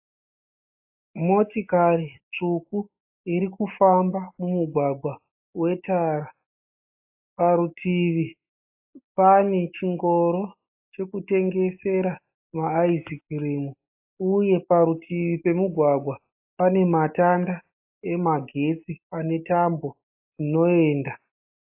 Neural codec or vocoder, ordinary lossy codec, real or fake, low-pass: none; AAC, 32 kbps; real; 3.6 kHz